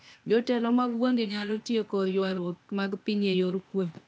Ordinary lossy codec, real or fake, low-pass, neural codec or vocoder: none; fake; none; codec, 16 kHz, 0.8 kbps, ZipCodec